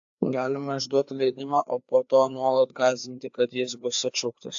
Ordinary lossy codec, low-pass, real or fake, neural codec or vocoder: AAC, 64 kbps; 7.2 kHz; fake; codec, 16 kHz, 2 kbps, FreqCodec, larger model